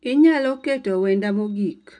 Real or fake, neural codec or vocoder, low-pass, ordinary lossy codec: real; none; 10.8 kHz; none